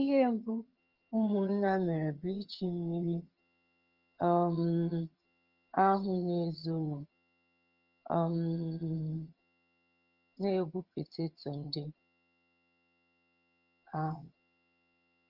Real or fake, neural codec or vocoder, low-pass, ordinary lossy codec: fake; vocoder, 22.05 kHz, 80 mel bands, HiFi-GAN; 5.4 kHz; Opus, 24 kbps